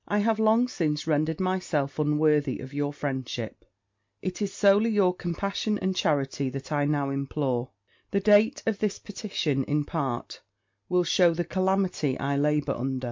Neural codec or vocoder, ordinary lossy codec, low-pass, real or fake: none; MP3, 48 kbps; 7.2 kHz; real